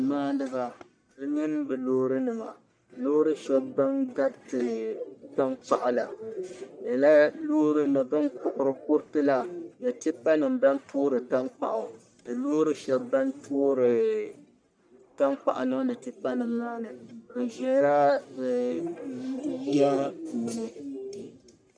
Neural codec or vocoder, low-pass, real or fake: codec, 44.1 kHz, 1.7 kbps, Pupu-Codec; 9.9 kHz; fake